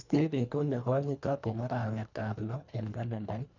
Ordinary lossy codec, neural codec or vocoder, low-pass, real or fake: none; codec, 24 kHz, 1.5 kbps, HILCodec; 7.2 kHz; fake